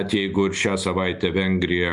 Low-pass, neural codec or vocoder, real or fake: 10.8 kHz; none; real